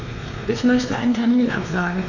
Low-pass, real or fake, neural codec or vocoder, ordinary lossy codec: 7.2 kHz; fake; codec, 16 kHz, 2 kbps, X-Codec, WavLM features, trained on Multilingual LibriSpeech; none